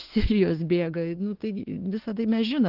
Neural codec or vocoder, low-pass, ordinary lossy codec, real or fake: autoencoder, 48 kHz, 32 numbers a frame, DAC-VAE, trained on Japanese speech; 5.4 kHz; Opus, 16 kbps; fake